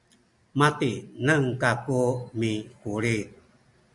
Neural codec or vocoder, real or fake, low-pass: none; real; 10.8 kHz